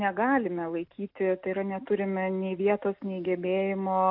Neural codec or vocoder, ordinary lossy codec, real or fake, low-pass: none; AAC, 48 kbps; real; 5.4 kHz